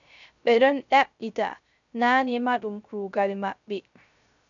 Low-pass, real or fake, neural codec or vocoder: 7.2 kHz; fake; codec, 16 kHz, 0.3 kbps, FocalCodec